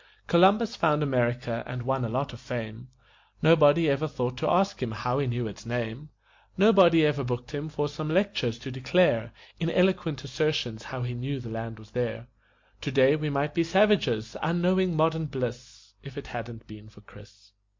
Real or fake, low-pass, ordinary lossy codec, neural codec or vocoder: real; 7.2 kHz; MP3, 48 kbps; none